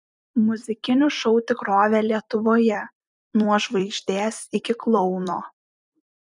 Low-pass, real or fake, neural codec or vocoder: 10.8 kHz; fake; vocoder, 44.1 kHz, 128 mel bands every 256 samples, BigVGAN v2